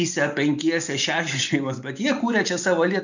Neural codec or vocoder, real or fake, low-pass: none; real; 7.2 kHz